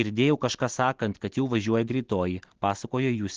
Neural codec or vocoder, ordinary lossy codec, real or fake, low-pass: none; Opus, 16 kbps; real; 7.2 kHz